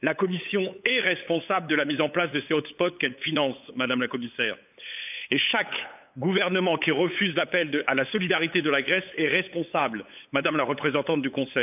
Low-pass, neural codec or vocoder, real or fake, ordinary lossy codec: 3.6 kHz; codec, 16 kHz, 8 kbps, FunCodec, trained on LibriTTS, 25 frames a second; fake; none